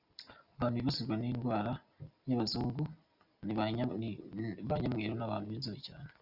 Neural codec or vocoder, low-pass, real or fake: none; 5.4 kHz; real